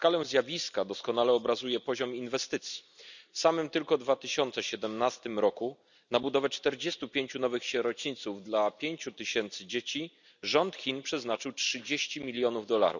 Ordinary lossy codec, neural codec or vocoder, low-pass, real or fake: none; none; 7.2 kHz; real